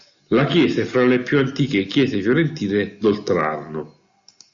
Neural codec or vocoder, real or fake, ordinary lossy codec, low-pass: none; real; Opus, 64 kbps; 7.2 kHz